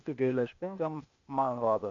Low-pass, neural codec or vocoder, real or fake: 7.2 kHz; codec, 16 kHz, 0.8 kbps, ZipCodec; fake